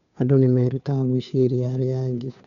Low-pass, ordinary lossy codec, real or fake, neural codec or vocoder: 7.2 kHz; none; fake; codec, 16 kHz, 2 kbps, FunCodec, trained on Chinese and English, 25 frames a second